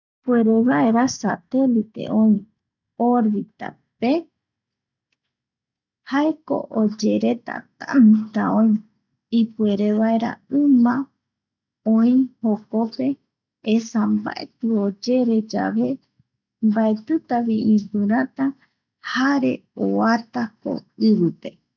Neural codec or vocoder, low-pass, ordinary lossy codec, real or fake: none; 7.2 kHz; none; real